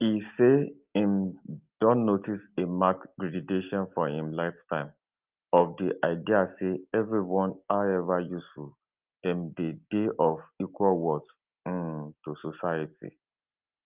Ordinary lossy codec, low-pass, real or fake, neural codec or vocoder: Opus, 32 kbps; 3.6 kHz; real; none